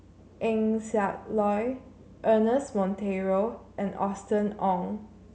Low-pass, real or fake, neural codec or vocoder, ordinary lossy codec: none; real; none; none